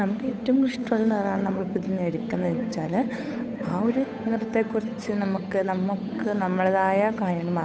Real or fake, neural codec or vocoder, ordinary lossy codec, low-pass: fake; codec, 16 kHz, 8 kbps, FunCodec, trained on Chinese and English, 25 frames a second; none; none